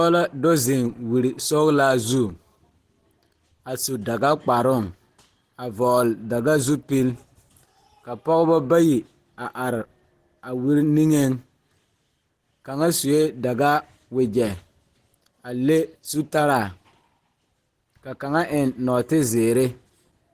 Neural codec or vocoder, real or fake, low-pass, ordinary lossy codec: none; real; 14.4 kHz; Opus, 16 kbps